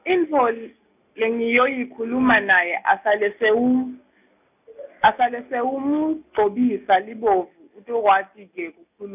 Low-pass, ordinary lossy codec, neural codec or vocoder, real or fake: 3.6 kHz; none; none; real